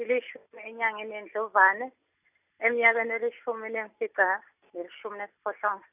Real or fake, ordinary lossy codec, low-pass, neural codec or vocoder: real; none; 3.6 kHz; none